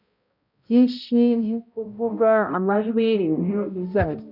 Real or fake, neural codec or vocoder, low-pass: fake; codec, 16 kHz, 0.5 kbps, X-Codec, HuBERT features, trained on balanced general audio; 5.4 kHz